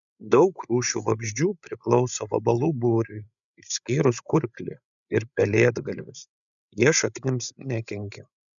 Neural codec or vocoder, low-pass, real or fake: codec, 16 kHz, 8 kbps, FreqCodec, larger model; 7.2 kHz; fake